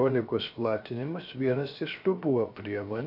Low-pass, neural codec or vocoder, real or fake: 5.4 kHz; codec, 16 kHz, 0.7 kbps, FocalCodec; fake